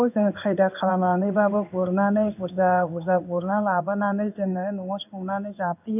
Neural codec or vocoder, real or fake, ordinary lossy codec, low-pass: codec, 16 kHz in and 24 kHz out, 1 kbps, XY-Tokenizer; fake; none; 3.6 kHz